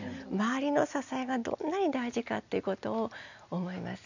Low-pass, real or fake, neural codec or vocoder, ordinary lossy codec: 7.2 kHz; real; none; none